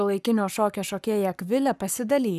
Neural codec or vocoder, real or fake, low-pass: codec, 44.1 kHz, 7.8 kbps, Pupu-Codec; fake; 14.4 kHz